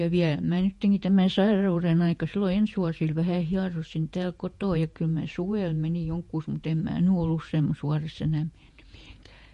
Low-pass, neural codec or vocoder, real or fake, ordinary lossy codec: 14.4 kHz; vocoder, 48 kHz, 128 mel bands, Vocos; fake; MP3, 48 kbps